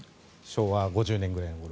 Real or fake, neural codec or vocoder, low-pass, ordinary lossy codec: real; none; none; none